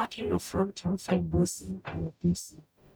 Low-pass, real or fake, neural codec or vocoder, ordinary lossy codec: none; fake; codec, 44.1 kHz, 0.9 kbps, DAC; none